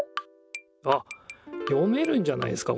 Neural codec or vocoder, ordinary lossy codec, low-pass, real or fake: none; none; none; real